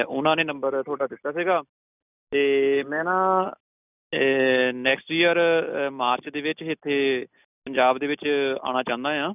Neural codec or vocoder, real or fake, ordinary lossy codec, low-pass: none; real; none; 3.6 kHz